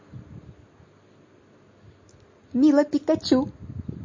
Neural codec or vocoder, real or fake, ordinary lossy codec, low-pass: none; real; MP3, 32 kbps; 7.2 kHz